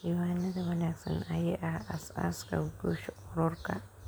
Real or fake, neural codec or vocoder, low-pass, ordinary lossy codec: fake; vocoder, 44.1 kHz, 128 mel bands every 512 samples, BigVGAN v2; none; none